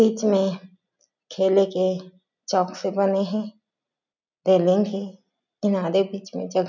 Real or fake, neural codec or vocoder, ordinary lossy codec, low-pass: real; none; none; 7.2 kHz